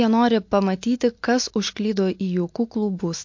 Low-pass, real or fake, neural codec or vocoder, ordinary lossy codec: 7.2 kHz; real; none; MP3, 48 kbps